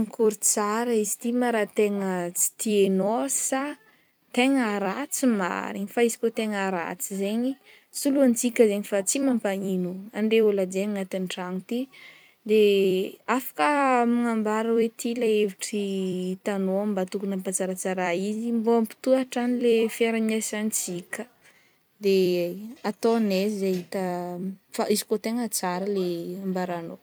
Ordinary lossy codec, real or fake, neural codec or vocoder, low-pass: none; fake; vocoder, 44.1 kHz, 128 mel bands every 256 samples, BigVGAN v2; none